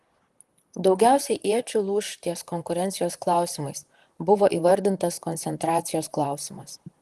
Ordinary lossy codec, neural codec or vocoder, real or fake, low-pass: Opus, 24 kbps; vocoder, 44.1 kHz, 128 mel bands, Pupu-Vocoder; fake; 14.4 kHz